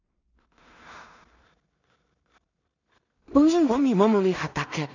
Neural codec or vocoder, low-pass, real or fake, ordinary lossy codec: codec, 16 kHz in and 24 kHz out, 0.4 kbps, LongCat-Audio-Codec, two codebook decoder; 7.2 kHz; fake; none